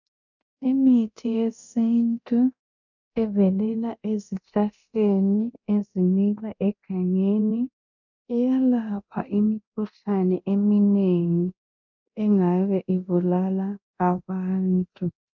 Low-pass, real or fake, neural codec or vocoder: 7.2 kHz; fake; codec, 24 kHz, 0.9 kbps, DualCodec